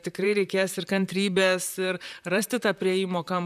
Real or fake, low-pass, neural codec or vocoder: fake; 14.4 kHz; vocoder, 44.1 kHz, 128 mel bands, Pupu-Vocoder